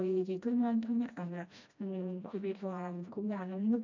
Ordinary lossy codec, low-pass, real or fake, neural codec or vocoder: MP3, 64 kbps; 7.2 kHz; fake; codec, 16 kHz, 1 kbps, FreqCodec, smaller model